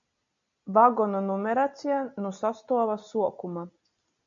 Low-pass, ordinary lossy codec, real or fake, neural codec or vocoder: 7.2 kHz; MP3, 64 kbps; real; none